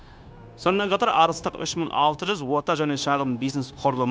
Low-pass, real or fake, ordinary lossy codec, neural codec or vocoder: none; fake; none; codec, 16 kHz, 0.9 kbps, LongCat-Audio-Codec